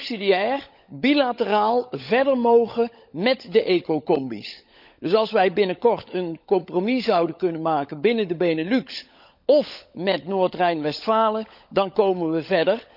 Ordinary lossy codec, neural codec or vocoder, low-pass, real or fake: none; codec, 16 kHz, 16 kbps, FunCodec, trained on LibriTTS, 50 frames a second; 5.4 kHz; fake